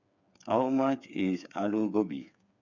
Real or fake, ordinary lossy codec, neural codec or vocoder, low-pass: fake; none; codec, 16 kHz, 8 kbps, FreqCodec, smaller model; 7.2 kHz